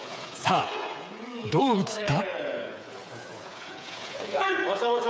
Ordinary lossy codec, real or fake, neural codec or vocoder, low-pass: none; fake; codec, 16 kHz, 8 kbps, FreqCodec, smaller model; none